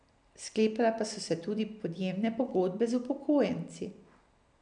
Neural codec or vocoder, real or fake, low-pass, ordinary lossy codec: none; real; 9.9 kHz; none